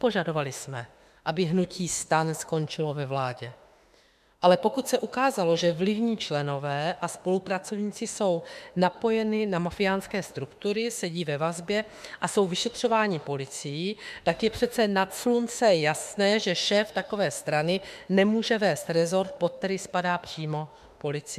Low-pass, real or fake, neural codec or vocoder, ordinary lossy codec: 14.4 kHz; fake; autoencoder, 48 kHz, 32 numbers a frame, DAC-VAE, trained on Japanese speech; MP3, 96 kbps